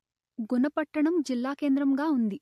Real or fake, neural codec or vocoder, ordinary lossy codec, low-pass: real; none; MP3, 64 kbps; 14.4 kHz